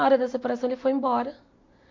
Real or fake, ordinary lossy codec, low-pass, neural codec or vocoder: real; MP3, 48 kbps; 7.2 kHz; none